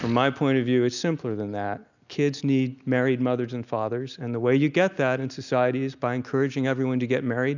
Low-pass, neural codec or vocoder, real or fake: 7.2 kHz; none; real